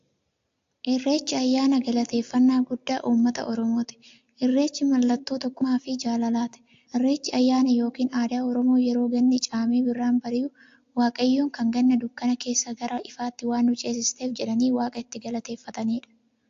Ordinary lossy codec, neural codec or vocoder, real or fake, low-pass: AAC, 48 kbps; none; real; 7.2 kHz